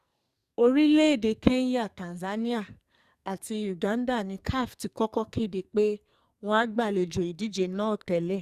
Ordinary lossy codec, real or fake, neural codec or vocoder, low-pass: Opus, 64 kbps; fake; codec, 44.1 kHz, 2.6 kbps, SNAC; 14.4 kHz